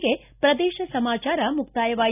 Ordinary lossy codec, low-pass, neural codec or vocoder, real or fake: none; 3.6 kHz; none; real